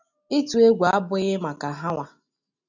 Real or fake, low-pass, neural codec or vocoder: real; 7.2 kHz; none